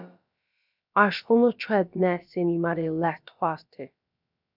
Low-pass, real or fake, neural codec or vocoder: 5.4 kHz; fake; codec, 16 kHz, about 1 kbps, DyCAST, with the encoder's durations